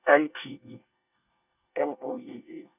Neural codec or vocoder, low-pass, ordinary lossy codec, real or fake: codec, 24 kHz, 1 kbps, SNAC; 3.6 kHz; none; fake